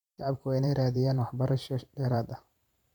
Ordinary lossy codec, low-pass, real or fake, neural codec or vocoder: MP3, 96 kbps; 19.8 kHz; fake; vocoder, 44.1 kHz, 128 mel bands every 512 samples, BigVGAN v2